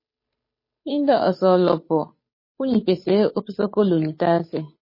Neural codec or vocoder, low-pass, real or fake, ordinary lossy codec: codec, 16 kHz, 8 kbps, FunCodec, trained on Chinese and English, 25 frames a second; 5.4 kHz; fake; MP3, 24 kbps